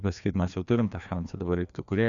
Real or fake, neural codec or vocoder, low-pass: fake; codec, 16 kHz, 2 kbps, FreqCodec, larger model; 7.2 kHz